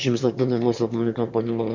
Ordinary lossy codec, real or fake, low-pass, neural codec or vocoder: none; fake; 7.2 kHz; autoencoder, 22.05 kHz, a latent of 192 numbers a frame, VITS, trained on one speaker